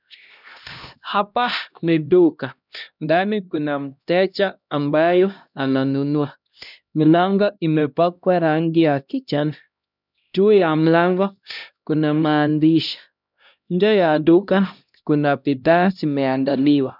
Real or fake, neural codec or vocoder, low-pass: fake; codec, 16 kHz, 1 kbps, X-Codec, HuBERT features, trained on LibriSpeech; 5.4 kHz